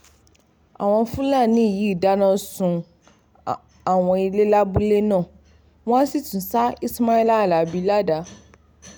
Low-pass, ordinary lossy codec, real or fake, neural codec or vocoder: none; none; real; none